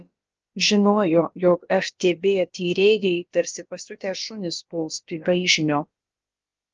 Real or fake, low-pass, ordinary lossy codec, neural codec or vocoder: fake; 7.2 kHz; Opus, 24 kbps; codec, 16 kHz, about 1 kbps, DyCAST, with the encoder's durations